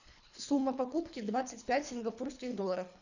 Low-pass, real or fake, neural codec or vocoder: 7.2 kHz; fake; codec, 24 kHz, 3 kbps, HILCodec